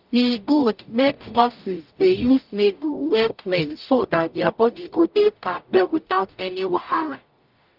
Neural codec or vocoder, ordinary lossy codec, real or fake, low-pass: codec, 44.1 kHz, 0.9 kbps, DAC; Opus, 24 kbps; fake; 5.4 kHz